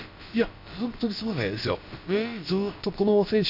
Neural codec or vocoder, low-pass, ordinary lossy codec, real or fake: codec, 16 kHz, about 1 kbps, DyCAST, with the encoder's durations; 5.4 kHz; Opus, 64 kbps; fake